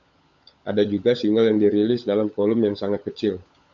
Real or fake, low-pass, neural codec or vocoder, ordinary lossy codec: fake; 7.2 kHz; codec, 16 kHz, 16 kbps, FunCodec, trained on LibriTTS, 50 frames a second; AAC, 64 kbps